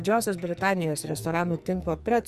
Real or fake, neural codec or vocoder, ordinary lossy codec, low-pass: fake; codec, 44.1 kHz, 2.6 kbps, SNAC; Opus, 64 kbps; 14.4 kHz